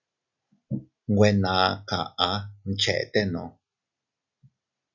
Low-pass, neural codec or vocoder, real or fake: 7.2 kHz; none; real